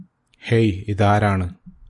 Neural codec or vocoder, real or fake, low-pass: none; real; 10.8 kHz